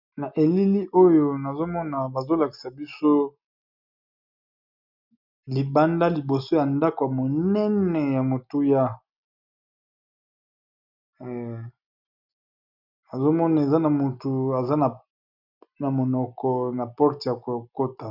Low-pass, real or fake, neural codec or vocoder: 5.4 kHz; real; none